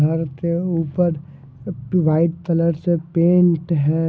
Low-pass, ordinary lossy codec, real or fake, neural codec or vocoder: none; none; real; none